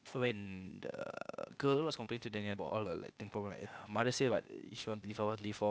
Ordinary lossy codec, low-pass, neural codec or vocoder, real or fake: none; none; codec, 16 kHz, 0.8 kbps, ZipCodec; fake